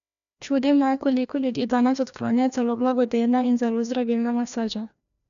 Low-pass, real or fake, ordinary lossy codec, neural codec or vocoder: 7.2 kHz; fake; none; codec, 16 kHz, 1 kbps, FreqCodec, larger model